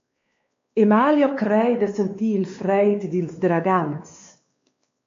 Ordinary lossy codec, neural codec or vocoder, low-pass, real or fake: MP3, 48 kbps; codec, 16 kHz, 2 kbps, X-Codec, WavLM features, trained on Multilingual LibriSpeech; 7.2 kHz; fake